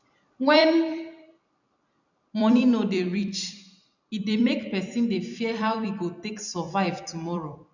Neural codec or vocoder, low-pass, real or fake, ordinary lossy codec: vocoder, 44.1 kHz, 128 mel bands every 256 samples, BigVGAN v2; 7.2 kHz; fake; none